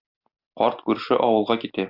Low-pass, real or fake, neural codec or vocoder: 5.4 kHz; real; none